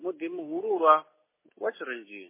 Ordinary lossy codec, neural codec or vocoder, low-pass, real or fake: MP3, 16 kbps; none; 3.6 kHz; real